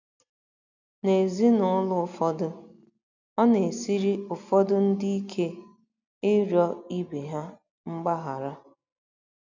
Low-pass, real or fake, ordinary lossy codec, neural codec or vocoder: 7.2 kHz; real; none; none